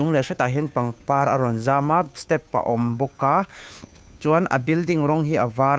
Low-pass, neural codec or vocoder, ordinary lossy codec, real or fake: none; codec, 16 kHz, 2 kbps, FunCodec, trained on Chinese and English, 25 frames a second; none; fake